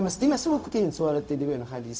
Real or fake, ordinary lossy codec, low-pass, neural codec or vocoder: fake; none; none; codec, 16 kHz, 0.4 kbps, LongCat-Audio-Codec